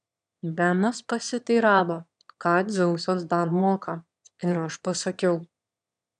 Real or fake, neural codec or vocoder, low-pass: fake; autoencoder, 22.05 kHz, a latent of 192 numbers a frame, VITS, trained on one speaker; 9.9 kHz